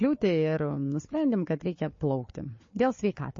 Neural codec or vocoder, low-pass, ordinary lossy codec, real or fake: codec, 16 kHz, 4 kbps, FunCodec, trained on Chinese and English, 50 frames a second; 7.2 kHz; MP3, 32 kbps; fake